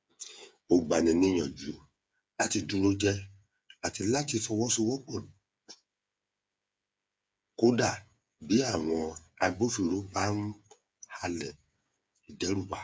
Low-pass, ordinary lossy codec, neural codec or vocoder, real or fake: none; none; codec, 16 kHz, 8 kbps, FreqCodec, smaller model; fake